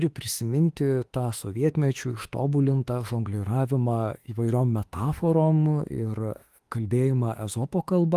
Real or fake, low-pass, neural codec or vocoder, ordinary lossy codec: fake; 14.4 kHz; autoencoder, 48 kHz, 32 numbers a frame, DAC-VAE, trained on Japanese speech; Opus, 24 kbps